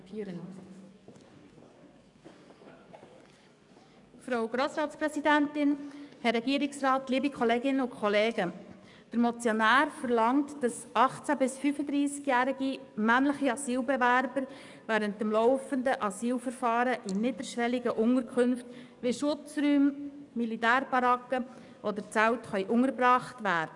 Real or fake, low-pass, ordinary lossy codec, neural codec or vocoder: fake; 10.8 kHz; none; codec, 44.1 kHz, 7.8 kbps, DAC